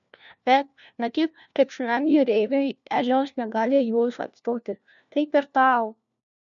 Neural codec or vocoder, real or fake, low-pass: codec, 16 kHz, 1 kbps, FunCodec, trained on LibriTTS, 50 frames a second; fake; 7.2 kHz